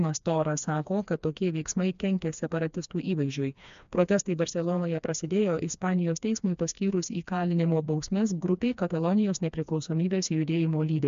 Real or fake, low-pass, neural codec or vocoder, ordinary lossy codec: fake; 7.2 kHz; codec, 16 kHz, 2 kbps, FreqCodec, smaller model; MP3, 64 kbps